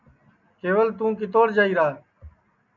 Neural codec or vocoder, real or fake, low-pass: none; real; 7.2 kHz